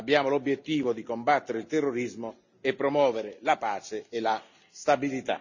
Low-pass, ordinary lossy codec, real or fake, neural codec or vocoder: 7.2 kHz; none; real; none